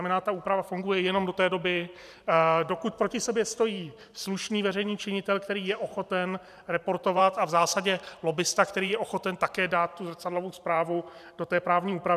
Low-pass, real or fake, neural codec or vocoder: 14.4 kHz; fake; vocoder, 44.1 kHz, 128 mel bands every 512 samples, BigVGAN v2